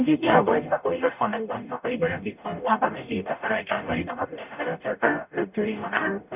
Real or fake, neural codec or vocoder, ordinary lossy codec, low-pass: fake; codec, 44.1 kHz, 0.9 kbps, DAC; none; 3.6 kHz